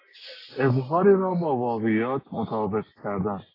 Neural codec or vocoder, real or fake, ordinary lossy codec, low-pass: none; real; AAC, 24 kbps; 5.4 kHz